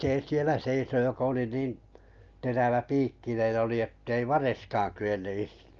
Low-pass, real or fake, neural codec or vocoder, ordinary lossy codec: 7.2 kHz; real; none; Opus, 24 kbps